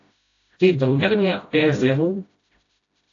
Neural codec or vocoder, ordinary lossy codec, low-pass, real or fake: codec, 16 kHz, 0.5 kbps, FreqCodec, smaller model; AAC, 48 kbps; 7.2 kHz; fake